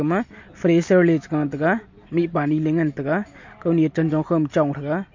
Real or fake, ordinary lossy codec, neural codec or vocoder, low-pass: real; MP3, 48 kbps; none; 7.2 kHz